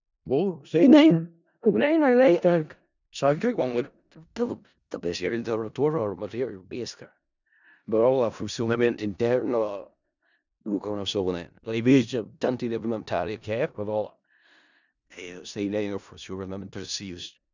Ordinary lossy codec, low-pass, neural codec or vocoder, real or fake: none; 7.2 kHz; codec, 16 kHz in and 24 kHz out, 0.4 kbps, LongCat-Audio-Codec, four codebook decoder; fake